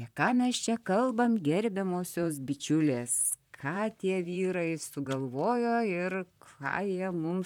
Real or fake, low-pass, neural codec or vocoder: fake; 19.8 kHz; vocoder, 44.1 kHz, 128 mel bands, Pupu-Vocoder